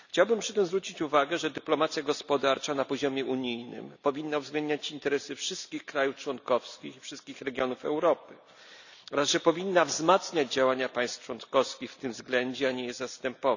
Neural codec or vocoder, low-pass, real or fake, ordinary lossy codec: none; 7.2 kHz; real; none